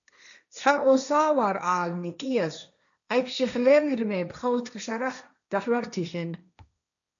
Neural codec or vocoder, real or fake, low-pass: codec, 16 kHz, 1.1 kbps, Voila-Tokenizer; fake; 7.2 kHz